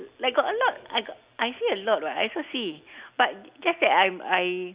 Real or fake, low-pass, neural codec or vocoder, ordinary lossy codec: real; 3.6 kHz; none; Opus, 64 kbps